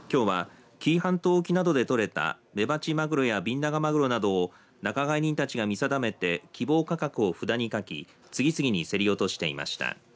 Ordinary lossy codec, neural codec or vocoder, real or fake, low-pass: none; none; real; none